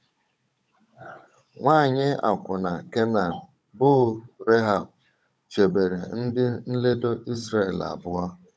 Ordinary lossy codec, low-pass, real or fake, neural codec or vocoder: none; none; fake; codec, 16 kHz, 16 kbps, FunCodec, trained on Chinese and English, 50 frames a second